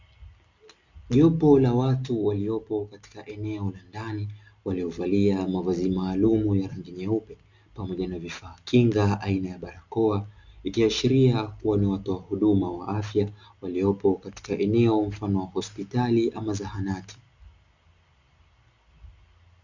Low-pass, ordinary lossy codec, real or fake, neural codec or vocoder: 7.2 kHz; Opus, 64 kbps; real; none